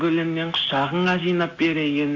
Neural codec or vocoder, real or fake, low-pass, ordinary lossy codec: none; real; 7.2 kHz; AAC, 48 kbps